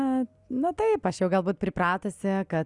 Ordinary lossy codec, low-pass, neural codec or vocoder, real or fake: Opus, 64 kbps; 10.8 kHz; none; real